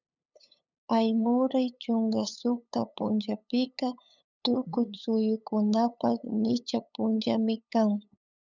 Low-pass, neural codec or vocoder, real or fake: 7.2 kHz; codec, 16 kHz, 8 kbps, FunCodec, trained on LibriTTS, 25 frames a second; fake